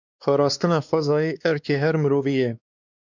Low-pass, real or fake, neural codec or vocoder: 7.2 kHz; fake; codec, 16 kHz, 2 kbps, X-Codec, WavLM features, trained on Multilingual LibriSpeech